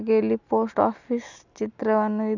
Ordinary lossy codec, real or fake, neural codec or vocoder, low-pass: none; real; none; 7.2 kHz